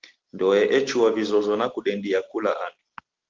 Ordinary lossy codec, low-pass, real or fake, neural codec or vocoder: Opus, 16 kbps; 7.2 kHz; real; none